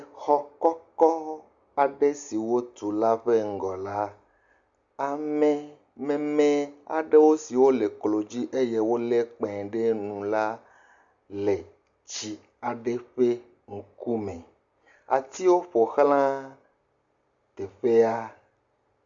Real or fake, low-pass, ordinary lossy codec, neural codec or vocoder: real; 7.2 kHz; Opus, 64 kbps; none